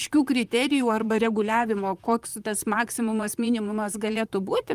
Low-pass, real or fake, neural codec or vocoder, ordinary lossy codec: 14.4 kHz; fake; vocoder, 44.1 kHz, 128 mel bands, Pupu-Vocoder; Opus, 32 kbps